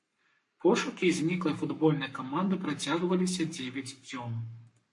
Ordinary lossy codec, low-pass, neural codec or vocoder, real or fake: MP3, 48 kbps; 10.8 kHz; codec, 44.1 kHz, 7.8 kbps, Pupu-Codec; fake